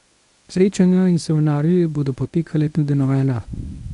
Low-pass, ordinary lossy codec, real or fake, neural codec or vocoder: 10.8 kHz; none; fake; codec, 24 kHz, 0.9 kbps, WavTokenizer, medium speech release version 1